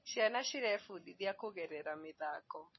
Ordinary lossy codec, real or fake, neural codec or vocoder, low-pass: MP3, 24 kbps; real; none; 7.2 kHz